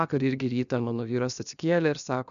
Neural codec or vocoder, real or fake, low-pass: codec, 16 kHz, 0.7 kbps, FocalCodec; fake; 7.2 kHz